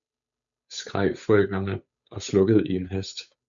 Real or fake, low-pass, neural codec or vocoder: fake; 7.2 kHz; codec, 16 kHz, 8 kbps, FunCodec, trained on Chinese and English, 25 frames a second